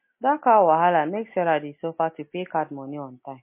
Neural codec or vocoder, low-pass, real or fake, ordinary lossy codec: none; 3.6 kHz; real; MP3, 24 kbps